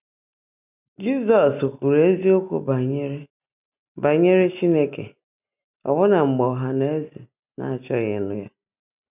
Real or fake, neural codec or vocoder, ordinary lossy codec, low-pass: real; none; none; 3.6 kHz